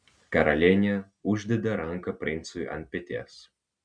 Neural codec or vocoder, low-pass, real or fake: none; 9.9 kHz; real